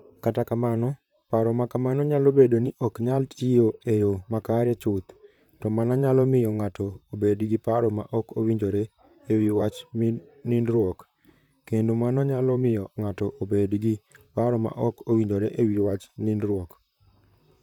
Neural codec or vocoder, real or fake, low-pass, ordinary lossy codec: vocoder, 44.1 kHz, 128 mel bands, Pupu-Vocoder; fake; 19.8 kHz; none